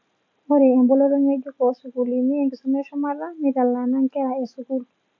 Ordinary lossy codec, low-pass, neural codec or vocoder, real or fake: MP3, 64 kbps; 7.2 kHz; none; real